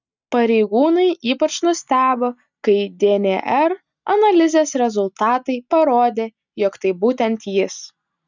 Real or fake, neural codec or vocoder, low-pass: real; none; 7.2 kHz